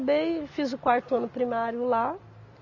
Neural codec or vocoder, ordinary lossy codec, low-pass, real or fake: none; none; 7.2 kHz; real